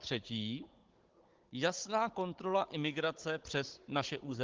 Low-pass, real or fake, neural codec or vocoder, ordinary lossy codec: 7.2 kHz; fake; codec, 16 kHz, 16 kbps, FunCodec, trained on Chinese and English, 50 frames a second; Opus, 16 kbps